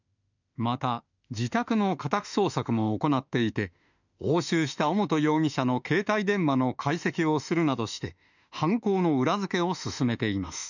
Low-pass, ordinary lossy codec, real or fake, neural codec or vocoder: 7.2 kHz; none; fake; autoencoder, 48 kHz, 32 numbers a frame, DAC-VAE, trained on Japanese speech